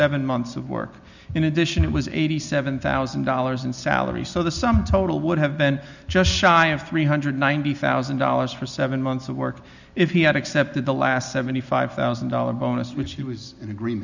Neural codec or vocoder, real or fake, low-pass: none; real; 7.2 kHz